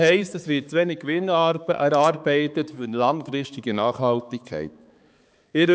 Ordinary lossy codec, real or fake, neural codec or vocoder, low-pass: none; fake; codec, 16 kHz, 4 kbps, X-Codec, HuBERT features, trained on balanced general audio; none